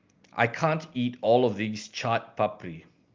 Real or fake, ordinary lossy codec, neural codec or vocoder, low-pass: real; Opus, 32 kbps; none; 7.2 kHz